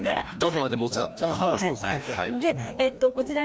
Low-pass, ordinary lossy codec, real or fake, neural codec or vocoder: none; none; fake; codec, 16 kHz, 1 kbps, FreqCodec, larger model